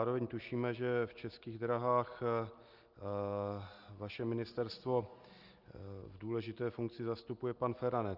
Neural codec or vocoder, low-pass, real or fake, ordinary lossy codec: none; 5.4 kHz; real; Opus, 32 kbps